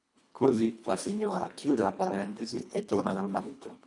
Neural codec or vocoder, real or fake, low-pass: codec, 24 kHz, 1.5 kbps, HILCodec; fake; 10.8 kHz